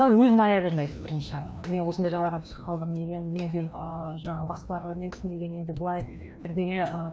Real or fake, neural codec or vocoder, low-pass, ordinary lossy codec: fake; codec, 16 kHz, 1 kbps, FreqCodec, larger model; none; none